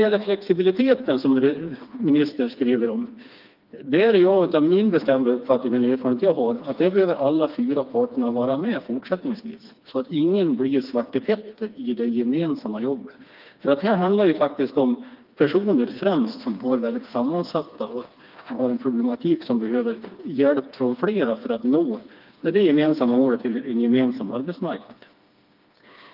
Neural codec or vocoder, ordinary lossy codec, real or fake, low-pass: codec, 16 kHz, 2 kbps, FreqCodec, smaller model; Opus, 24 kbps; fake; 5.4 kHz